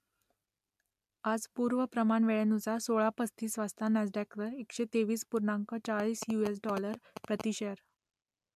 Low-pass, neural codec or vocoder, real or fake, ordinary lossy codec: 14.4 kHz; none; real; MP3, 96 kbps